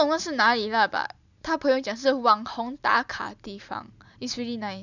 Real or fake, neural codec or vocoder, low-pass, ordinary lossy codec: real; none; 7.2 kHz; none